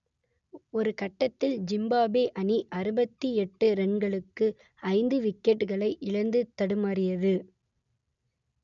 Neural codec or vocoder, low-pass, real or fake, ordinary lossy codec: none; 7.2 kHz; real; none